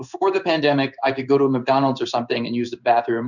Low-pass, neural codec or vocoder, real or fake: 7.2 kHz; codec, 16 kHz in and 24 kHz out, 1 kbps, XY-Tokenizer; fake